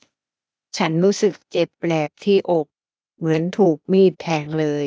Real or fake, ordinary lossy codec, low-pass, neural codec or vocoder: fake; none; none; codec, 16 kHz, 0.8 kbps, ZipCodec